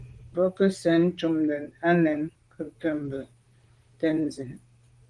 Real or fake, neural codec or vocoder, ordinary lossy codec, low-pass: fake; vocoder, 44.1 kHz, 128 mel bands, Pupu-Vocoder; Opus, 24 kbps; 10.8 kHz